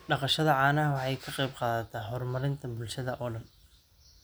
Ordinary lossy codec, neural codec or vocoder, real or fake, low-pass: none; none; real; none